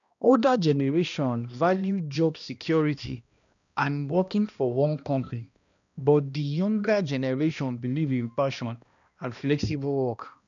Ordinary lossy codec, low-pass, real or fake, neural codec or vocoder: MP3, 96 kbps; 7.2 kHz; fake; codec, 16 kHz, 1 kbps, X-Codec, HuBERT features, trained on balanced general audio